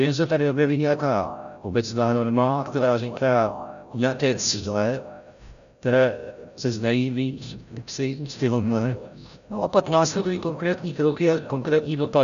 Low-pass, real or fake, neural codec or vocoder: 7.2 kHz; fake; codec, 16 kHz, 0.5 kbps, FreqCodec, larger model